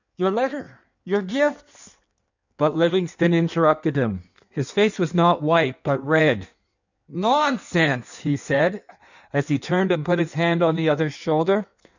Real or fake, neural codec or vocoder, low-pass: fake; codec, 16 kHz in and 24 kHz out, 1.1 kbps, FireRedTTS-2 codec; 7.2 kHz